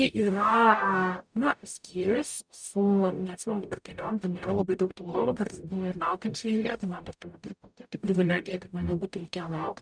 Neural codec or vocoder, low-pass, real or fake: codec, 44.1 kHz, 0.9 kbps, DAC; 9.9 kHz; fake